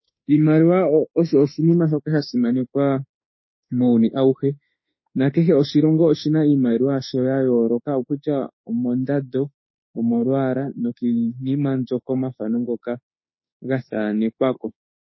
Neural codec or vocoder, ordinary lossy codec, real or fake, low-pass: autoencoder, 48 kHz, 32 numbers a frame, DAC-VAE, trained on Japanese speech; MP3, 24 kbps; fake; 7.2 kHz